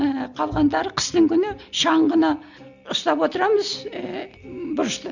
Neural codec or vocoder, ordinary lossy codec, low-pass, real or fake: none; none; 7.2 kHz; real